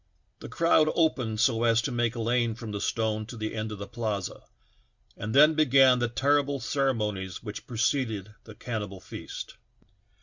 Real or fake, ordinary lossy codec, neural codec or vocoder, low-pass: real; Opus, 64 kbps; none; 7.2 kHz